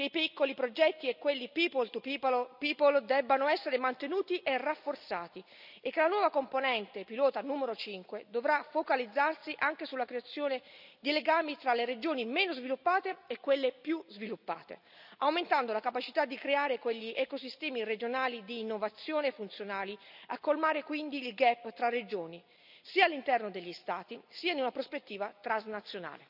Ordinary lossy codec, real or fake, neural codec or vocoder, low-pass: none; real; none; 5.4 kHz